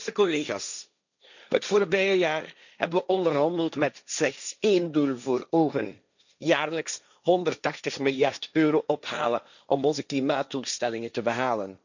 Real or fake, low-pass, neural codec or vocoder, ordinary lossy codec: fake; 7.2 kHz; codec, 16 kHz, 1.1 kbps, Voila-Tokenizer; none